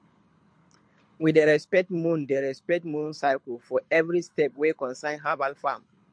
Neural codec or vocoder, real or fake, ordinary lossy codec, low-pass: codec, 24 kHz, 6 kbps, HILCodec; fake; MP3, 48 kbps; 9.9 kHz